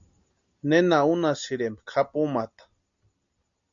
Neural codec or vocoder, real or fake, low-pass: none; real; 7.2 kHz